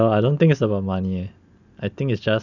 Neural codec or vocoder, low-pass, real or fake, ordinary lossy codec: none; 7.2 kHz; real; none